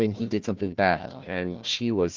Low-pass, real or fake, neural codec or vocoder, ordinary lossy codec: 7.2 kHz; fake; codec, 16 kHz, 1 kbps, FunCodec, trained on Chinese and English, 50 frames a second; Opus, 32 kbps